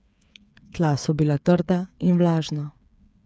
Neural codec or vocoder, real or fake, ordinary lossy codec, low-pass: codec, 16 kHz, 8 kbps, FreqCodec, smaller model; fake; none; none